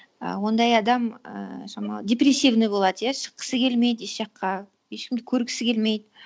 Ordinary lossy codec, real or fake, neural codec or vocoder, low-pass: none; real; none; none